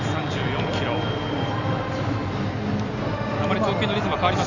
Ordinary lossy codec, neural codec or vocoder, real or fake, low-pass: none; none; real; 7.2 kHz